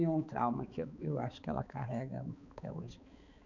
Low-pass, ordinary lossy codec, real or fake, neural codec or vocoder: 7.2 kHz; none; fake; codec, 16 kHz, 4 kbps, X-Codec, HuBERT features, trained on balanced general audio